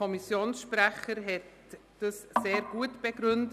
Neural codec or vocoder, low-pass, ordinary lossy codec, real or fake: none; 14.4 kHz; none; real